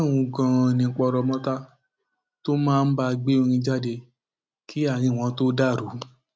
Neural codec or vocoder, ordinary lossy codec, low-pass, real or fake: none; none; none; real